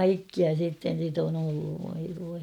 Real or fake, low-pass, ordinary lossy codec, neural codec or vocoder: real; 19.8 kHz; none; none